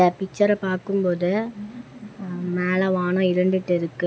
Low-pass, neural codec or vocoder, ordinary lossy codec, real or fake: none; none; none; real